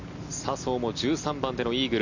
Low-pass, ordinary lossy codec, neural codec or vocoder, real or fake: 7.2 kHz; none; none; real